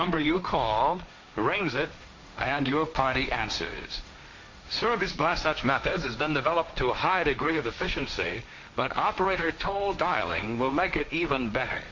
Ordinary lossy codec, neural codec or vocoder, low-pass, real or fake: MP3, 48 kbps; codec, 16 kHz, 1.1 kbps, Voila-Tokenizer; 7.2 kHz; fake